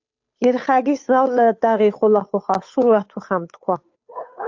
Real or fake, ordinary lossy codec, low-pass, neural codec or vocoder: fake; AAC, 48 kbps; 7.2 kHz; codec, 16 kHz, 8 kbps, FunCodec, trained on Chinese and English, 25 frames a second